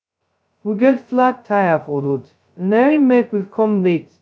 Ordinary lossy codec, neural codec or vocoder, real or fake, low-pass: none; codec, 16 kHz, 0.2 kbps, FocalCodec; fake; none